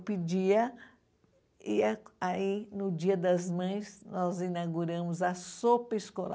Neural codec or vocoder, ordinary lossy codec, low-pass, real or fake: none; none; none; real